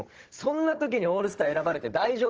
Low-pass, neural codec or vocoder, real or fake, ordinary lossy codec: 7.2 kHz; codec, 16 kHz, 16 kbps, FunCodec, trained on Chinese and English, 50 frames a second; fake; Opus, 16 kbps